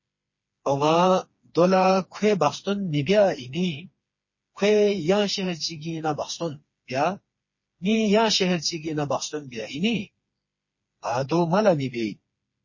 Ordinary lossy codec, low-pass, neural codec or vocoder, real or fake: MP3, 32 kbps; 7.2 kHz; codec, 16 kHz, 4 kbps, FreqCodec, smaller model; fake